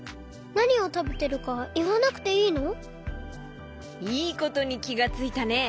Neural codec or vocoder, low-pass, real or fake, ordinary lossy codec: none; none; real; none